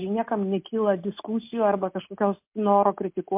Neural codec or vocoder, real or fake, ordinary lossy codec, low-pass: none; real; MP3, 32 kbps; 3.6 kHz